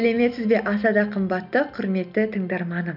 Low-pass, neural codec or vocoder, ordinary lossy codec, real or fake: 5.4 kHz; none; none; real